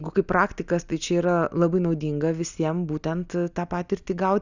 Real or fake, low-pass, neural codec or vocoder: real; 7.2 kHz; none